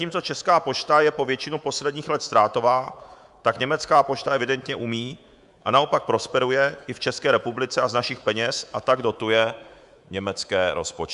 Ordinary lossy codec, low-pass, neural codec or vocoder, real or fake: Opus, 64 kbps; 10.8 kHz; codec, 24 kHz, 3.1 kbps, DualCodec; fake